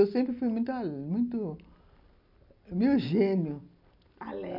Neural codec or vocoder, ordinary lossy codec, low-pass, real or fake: none; none; 5.4 kHz; real